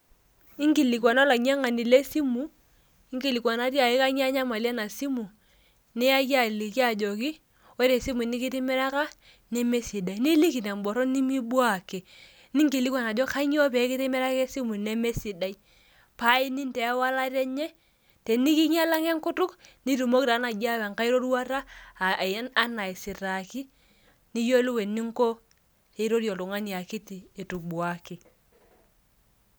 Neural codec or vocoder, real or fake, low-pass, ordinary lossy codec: none; real; none; none